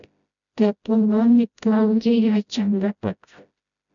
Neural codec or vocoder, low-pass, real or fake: codec, 16 kHz, 0.5 kbps, FreqCodec, smaller model; 7.2 kHz; fake